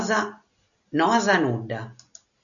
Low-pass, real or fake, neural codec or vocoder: 7.2 kHz; real; none